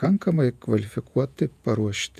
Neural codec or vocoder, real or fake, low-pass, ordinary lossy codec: vocoder, 48 kHz, 128 mel bands, Vocos; fake; 14.4 kHz; MP3, 96 kbps